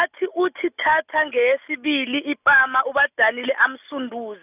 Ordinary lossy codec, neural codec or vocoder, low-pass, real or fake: none; none; 3.6 kHz; real